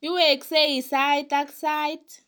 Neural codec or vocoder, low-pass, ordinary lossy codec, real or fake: none; none; none; real